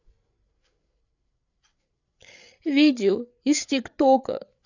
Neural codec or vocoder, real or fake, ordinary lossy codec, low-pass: codec, 16 kHz, 8 kbps, FreqCodec, larger model; fake; none; 7.2 kHz